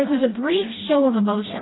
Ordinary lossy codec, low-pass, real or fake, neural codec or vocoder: AAC, 16 kbps; 7.2 kHz; fake; codec, 16 kHz, 1 kbps, FreqCodec, smaller model